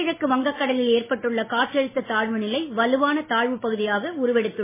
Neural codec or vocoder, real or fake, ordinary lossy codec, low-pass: none; real; MP3, 16 kbps; 3.6 kHz